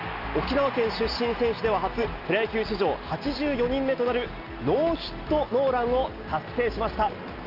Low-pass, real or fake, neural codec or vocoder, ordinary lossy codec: 5.4 kHz; real; none; Opus, 24 kbps